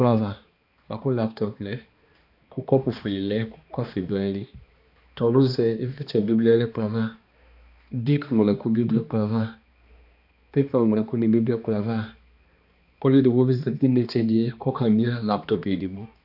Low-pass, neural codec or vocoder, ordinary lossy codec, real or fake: 5.4 kHz; codec, 16 kHz, 2 kbps, X-Codec, HuBERT features, trained on balanced general audio; AAC, 48 kbps; fake